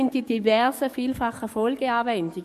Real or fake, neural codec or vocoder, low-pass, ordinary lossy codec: fake; codec, 44.1 kHz, 7.8 kbps, DAC; 14.4 kHz; MP3, 64 kbps